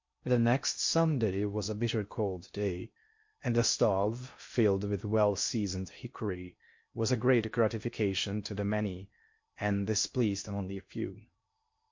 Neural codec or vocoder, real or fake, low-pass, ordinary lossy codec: codec, 16 kHz in and 24 kHz out, 0.6 kbps, FocalCodec, streaming, 2048 codes; fake; 7.2 kHz; MP3, 48 kbps